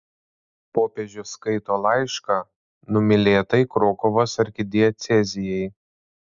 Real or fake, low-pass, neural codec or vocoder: real; 7.2 kHz; none